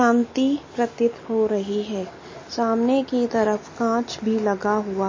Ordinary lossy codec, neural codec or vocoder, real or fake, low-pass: MP3, 32 kbps; none; real; 7.2 kHz